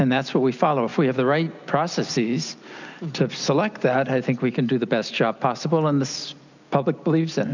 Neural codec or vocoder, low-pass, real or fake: none; 7.2 kHz; real